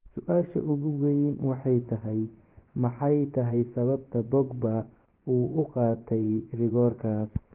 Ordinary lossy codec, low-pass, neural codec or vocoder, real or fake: Opus, 16 kbps; 3.6 kHz; vocoder, 24 kHz, 100 mel bands, Vocos; fake